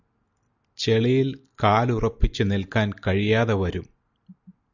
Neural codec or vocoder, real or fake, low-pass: none; real; 7.2 kHz